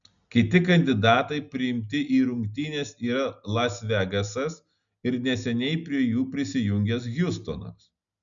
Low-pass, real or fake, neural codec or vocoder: 7.2 kHz; real; none